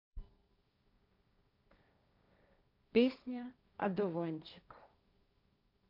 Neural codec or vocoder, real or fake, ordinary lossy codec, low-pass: codec, 16 kHz, 1.1 kbps, Voila-Tokenizer; fake; none; 5.4 kHz